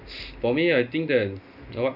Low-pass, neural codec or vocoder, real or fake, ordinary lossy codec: 5.4 kHz; none; real; none